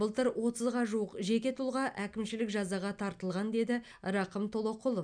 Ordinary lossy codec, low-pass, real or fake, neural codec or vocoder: none; 9.9 kHz; real; none